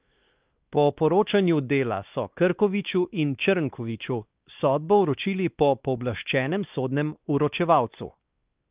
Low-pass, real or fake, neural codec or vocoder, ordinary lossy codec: 3.6 kHz; fake; codec, 16 kHz, 2 kbps, X-Codec, WavLM features, trained on Multilingual LibriSpeech; Opus, 24 kbps